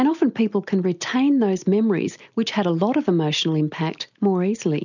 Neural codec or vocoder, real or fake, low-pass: none; real; 7.2 kHz